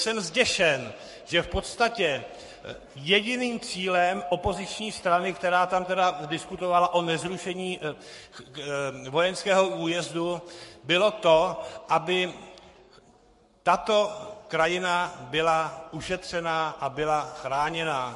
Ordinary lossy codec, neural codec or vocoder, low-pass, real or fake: MP3, 48 kbps; codec, 44.1 kHz, 7.8 kbps, Pupu-Codec; 14.4 kHz; fake